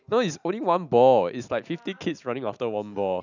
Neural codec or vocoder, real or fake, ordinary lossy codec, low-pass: none; real; none; 7.2 kHz